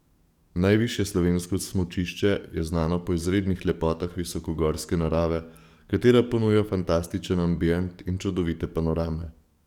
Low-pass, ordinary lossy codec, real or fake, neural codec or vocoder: 19.8 kHz; none; fake; codec, 44.1 kHz, 7.8 kbps, DAC